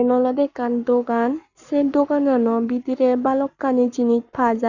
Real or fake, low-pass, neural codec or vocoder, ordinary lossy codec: fake; 7.2 kHz; codec, 44.1 kHz, 7.8 kbps, Pupu-Codec; none